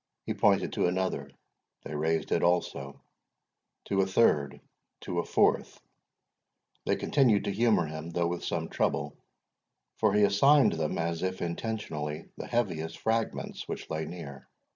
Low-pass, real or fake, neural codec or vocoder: 7.2 kHz; real; none